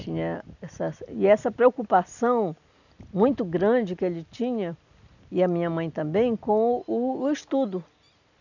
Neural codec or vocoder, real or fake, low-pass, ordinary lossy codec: none; real; 7.2 kHz; none